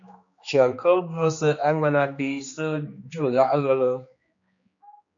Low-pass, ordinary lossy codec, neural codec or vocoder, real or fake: 7.2 kHz; MP3, 48 kbps; codec, 16 kHz, 2 kbps, X-Codec, HuBERT features, trained on general audio; fake